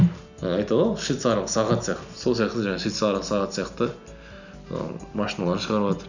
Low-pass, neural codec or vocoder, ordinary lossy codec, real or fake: 7.2 kHz; none; none; real